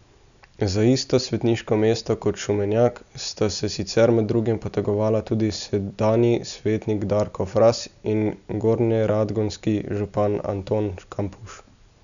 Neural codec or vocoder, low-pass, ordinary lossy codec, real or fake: none; 7.2 kHz; none; real